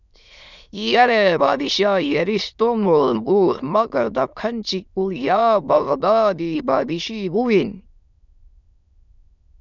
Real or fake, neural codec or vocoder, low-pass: fake; autoencoder, 22.05 kHz, a latent of 192 numbers a frame, VITS, trained on many speakers; 7.2 kHz